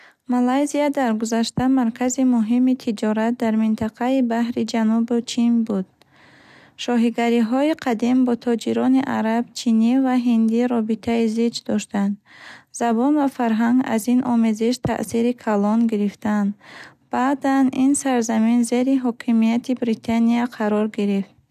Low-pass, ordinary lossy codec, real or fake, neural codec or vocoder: 14.4 kHz; none; real; none